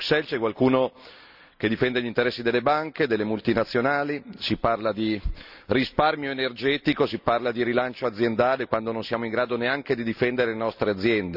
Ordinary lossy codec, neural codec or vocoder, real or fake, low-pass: none; none; real; 5.4 kHz